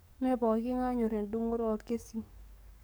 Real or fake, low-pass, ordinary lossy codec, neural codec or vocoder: fake; none; none; codec, 44.1 kHz, 7.8 kbps, DAC